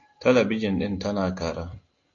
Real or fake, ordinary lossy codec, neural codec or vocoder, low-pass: real; AAC, 48 kbps; none; 7.2 kHz